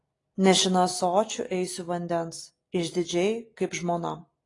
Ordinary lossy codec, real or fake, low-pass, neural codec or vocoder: AAC, 32 kbps; real; 10.8 kHz; none